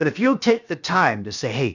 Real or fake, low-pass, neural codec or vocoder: fake; 7.2 kHz; codec, 16 kHz, 0.7 kbps, FocalCodec